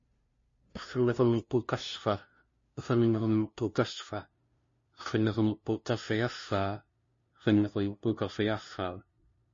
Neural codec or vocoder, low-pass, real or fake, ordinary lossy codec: codec, 16 kHz, 0.5 kbps, FunCodec, trained on LibriTTS, 25 frames a second; 7.2 kHz; fake; MP3, 32 kbps